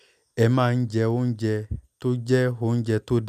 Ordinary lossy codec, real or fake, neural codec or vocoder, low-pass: none; real; none; 14.4 kHz